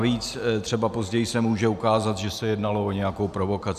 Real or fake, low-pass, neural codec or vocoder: real; 14.4 kHz; none